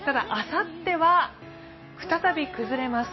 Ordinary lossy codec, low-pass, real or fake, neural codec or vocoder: MP3, 24 kbps; 7.2 kHz; real; none